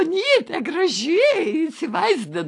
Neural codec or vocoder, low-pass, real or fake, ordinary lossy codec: none; 10.8 kHz; real; AAC, 48 kbps